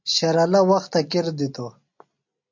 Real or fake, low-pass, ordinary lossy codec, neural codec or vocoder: real; 7.2 kHz; MP3, 48 kbps; none